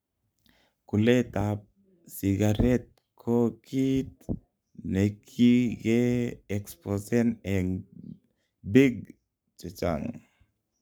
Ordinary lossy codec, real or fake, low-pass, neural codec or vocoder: none; fake; none; codec, 44.1 kHz, 7.8 kbps, Pupu-Codec